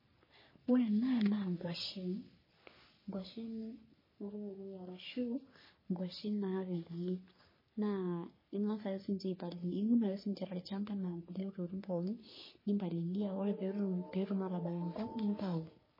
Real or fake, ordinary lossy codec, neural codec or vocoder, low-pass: fake; MP3, 24 kbps; codec, 44.1 kHz, 3.4 kbps, Pupu-Codec; 5.4 kHz